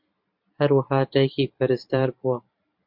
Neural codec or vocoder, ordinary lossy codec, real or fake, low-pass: vocoder, 44.1 kHz, 128 mel bands every 512 samples, BigVGAN v2; MP3, 48 kbps; fake; 5.4 kHz